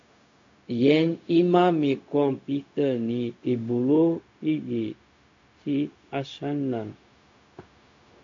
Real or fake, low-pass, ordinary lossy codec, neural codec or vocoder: fake; 7.2 kHz; AAC, 48 kbps; codec, 16 kHz, 0.4 kbps, LongCat-Audio-Codec